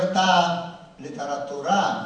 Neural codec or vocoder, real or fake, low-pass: vocoder, 24 kHz, 100 mel bands, Vocos; fake; 9.9 kHz